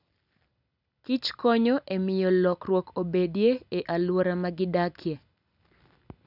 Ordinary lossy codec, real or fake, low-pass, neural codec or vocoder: none; real; 5.4 kHz; none